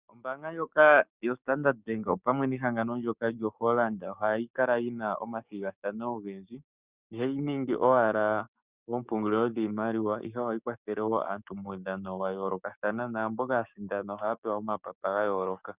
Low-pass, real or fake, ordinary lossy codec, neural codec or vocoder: 3.6 kHz; fake; Opus, 64 kbps; codec, 16 kHz, 6 kbps, DAC